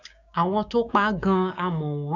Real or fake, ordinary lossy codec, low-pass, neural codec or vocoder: fake; none; 7.2 kHz; codec, 16 kHz, 6 kbps, DAC